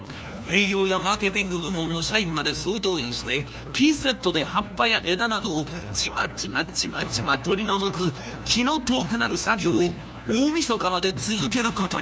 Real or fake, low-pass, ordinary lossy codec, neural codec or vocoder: fake; none; none; codec, 16 kHz, 1 kbps, FunCodec, trained on LibriTTS, 50 frames a second